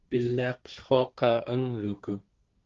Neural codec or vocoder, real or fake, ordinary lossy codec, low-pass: codec, 16 kHz, 1.1 kbps, Voila-Tokenizer; fake; Opus, 32 kbps; 7.2 kHz